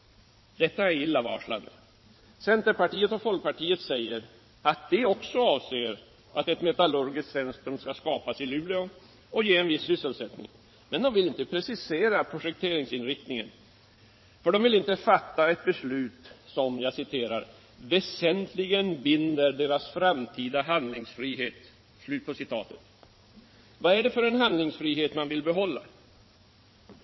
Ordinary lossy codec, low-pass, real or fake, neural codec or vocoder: MP3, 24 kbps; 7.2 kHz; fake; vocoder, 22.05 kHz, 80 mel bands, Vocos